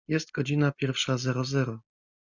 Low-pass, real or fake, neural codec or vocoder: 7.2 kHz; real; none